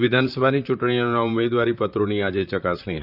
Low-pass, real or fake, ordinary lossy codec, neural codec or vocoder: 5.4 kHz; fake; none; vocoder, 44.1 kHz, 128 mel bands, Pupu-Vocoder